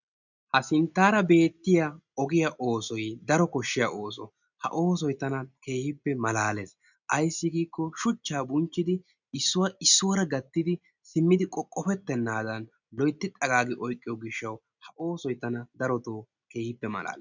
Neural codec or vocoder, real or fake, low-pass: none; real; 7.2 kHz